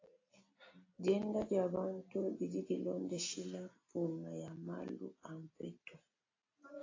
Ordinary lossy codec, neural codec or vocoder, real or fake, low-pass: AAC, 32 kbps; none; real; 7.2 kHz